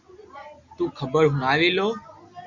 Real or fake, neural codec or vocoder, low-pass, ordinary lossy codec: real; none; 7.2 kHz; Opus, 64 kbps